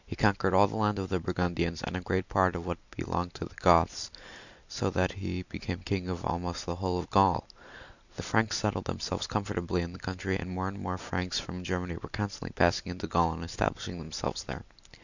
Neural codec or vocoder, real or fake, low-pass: none; real; 7.2 kHz